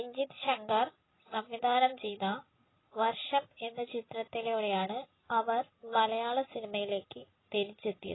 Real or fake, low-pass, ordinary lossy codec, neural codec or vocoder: real; 7.2 kHz; AAC, 16 kbps; none